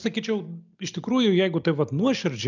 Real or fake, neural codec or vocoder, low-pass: real; none; 7.2 kHz